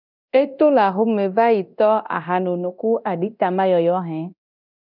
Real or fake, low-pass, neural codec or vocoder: fake; 5.4 kHz; codec, 24 kHz, 0.9 kbps, DualCodec